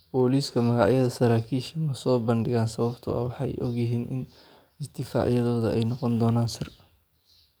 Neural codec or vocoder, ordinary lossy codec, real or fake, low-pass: codec, 44.1 kHz, 7.8 kbps, DAC; none; fake; none